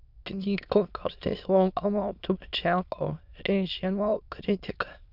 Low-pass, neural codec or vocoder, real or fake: 5.4 kHz; autoencoder, 22.05 kHz, a latent of 192 numbers a frame, VITS, trained on many speakers; fake